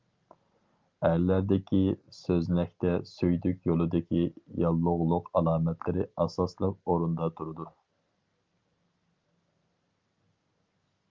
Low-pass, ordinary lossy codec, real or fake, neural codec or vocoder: 7.2 kHz; Opus, 24 kbps; real; none